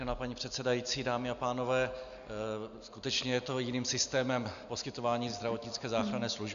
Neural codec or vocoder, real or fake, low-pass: none; real; 7.2 kHz